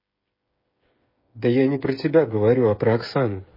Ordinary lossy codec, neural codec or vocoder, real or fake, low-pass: MP3, 24 kbps; codec, 16 kHz, 8 kbps, FreqCodec, smaller model; fake; 5.4 kHz